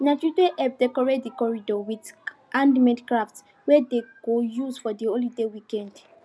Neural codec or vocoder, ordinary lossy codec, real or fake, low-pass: none; none; real; none